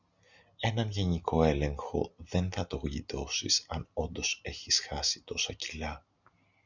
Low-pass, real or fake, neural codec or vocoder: 7.2 kHz; real; none